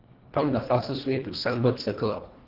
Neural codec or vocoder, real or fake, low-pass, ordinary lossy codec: codec, 24 kHz, 1.5 kbps, HILCodec; fake; 5.4 kHz; Opus, 16 kbps